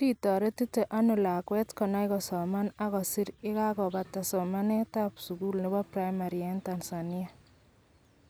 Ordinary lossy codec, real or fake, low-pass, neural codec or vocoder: none; real; none; none